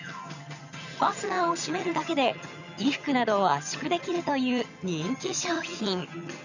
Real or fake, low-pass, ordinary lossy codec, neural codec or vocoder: fake; 7.2 kHz; none; vocoder, 22.05 kHz, 80 mel bands, HiFi-GAN